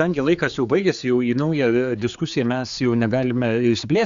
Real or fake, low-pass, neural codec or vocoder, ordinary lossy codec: fake; 7.2 kHz; codec, 16 kHz, 4 kbps, X-Codec, HuBERT features, trained on general audio; Opus, 64 kbps